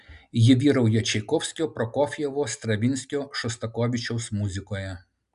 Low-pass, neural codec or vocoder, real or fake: 10.8 kHz; none; real